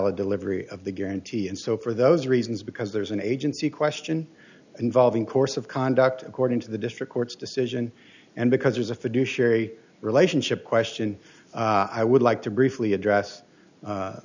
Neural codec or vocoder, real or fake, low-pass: none; real; 7.2 kHz